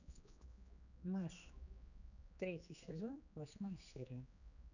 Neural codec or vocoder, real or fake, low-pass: codec, 16 kHz, 2 kbps, X-Codec, HuBERT features, trained on general audio; fake; 7.2 kHz